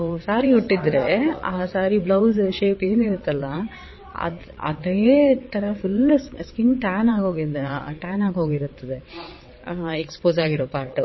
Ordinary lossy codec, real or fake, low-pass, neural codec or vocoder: MP3, 24 kbps; fake; 7.2 kHz; vocoder, 22.05 kHz, 80 mel bands, WaveNeXt